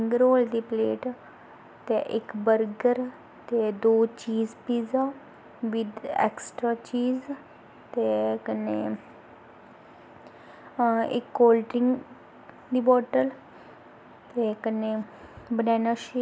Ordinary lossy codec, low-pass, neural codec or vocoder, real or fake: none; none; none; real